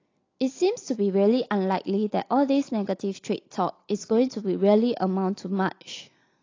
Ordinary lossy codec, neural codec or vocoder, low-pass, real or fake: AAC, 32 kbps; none; 7.2 kHz; real